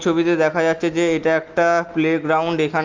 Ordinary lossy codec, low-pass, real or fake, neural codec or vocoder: Opus, 24 kbps; 7.2 kHz; real; none